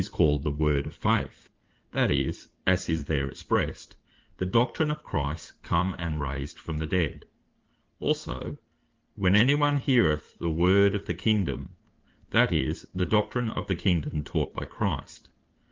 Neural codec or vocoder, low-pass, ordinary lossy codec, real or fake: codec, 16 kHz in and 24 kHz out, 2.2 kbps, FireRedTTS-2 codec; 7.2 kHz; Opus, 32 kbps; fake